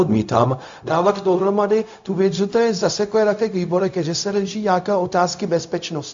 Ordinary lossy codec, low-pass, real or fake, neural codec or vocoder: AAC, 64 kbps; 7.2 kHz; fake; codec, 16 kHz, 0.4 kbps, LongCat-Audio-Codec